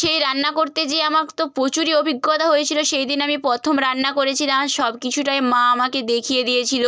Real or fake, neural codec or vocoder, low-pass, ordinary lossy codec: real; none; none; none